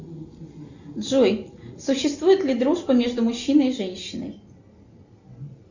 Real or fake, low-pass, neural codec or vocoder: real; 7.2 kHz; none